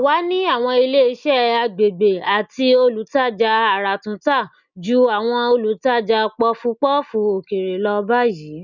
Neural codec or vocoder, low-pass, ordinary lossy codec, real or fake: none; 7.2 kHz; none; real